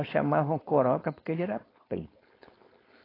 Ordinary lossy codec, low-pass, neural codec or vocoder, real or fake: AAC, 24 kbps; 5.4 kHz; codec, 16 kHz, 4.8 kbps, FACodec; fake